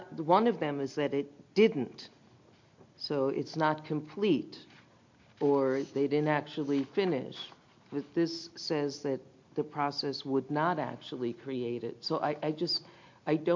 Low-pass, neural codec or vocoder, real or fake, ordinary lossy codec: 7.2 kHz; none; real; MP3, 48 kbps